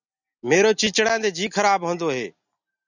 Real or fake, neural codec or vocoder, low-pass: real; none; 7.2 kHz